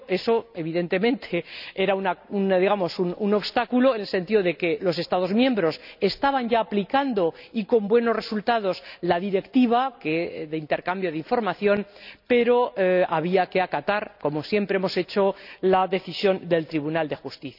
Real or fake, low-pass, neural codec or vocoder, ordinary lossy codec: real; 5.4 kHz; none; none